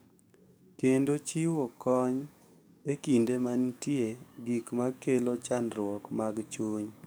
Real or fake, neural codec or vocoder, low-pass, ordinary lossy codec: fake; codec, 44.1 kHz, 7.8 kbps, DAC; none; none